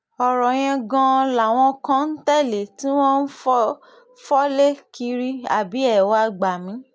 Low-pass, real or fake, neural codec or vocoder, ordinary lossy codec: none; real; none; none